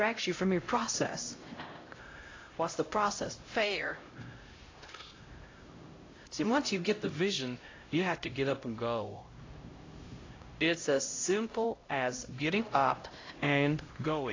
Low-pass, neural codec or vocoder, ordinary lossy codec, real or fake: 7.2 kHz; codec, 16 kHz, 0.5 kbps, X-Codec, HuBERT features, trained on LibriSpeech; AAC, 32 kbps; fake